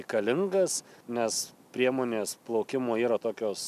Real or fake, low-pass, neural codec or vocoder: real; 14.4 kHz; none